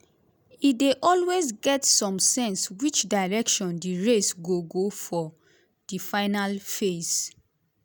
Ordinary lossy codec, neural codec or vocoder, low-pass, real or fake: none; none; none; real